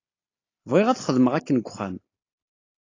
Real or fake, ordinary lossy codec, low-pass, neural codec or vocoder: real; AAC, 32 kbps; 7.2 kHz; none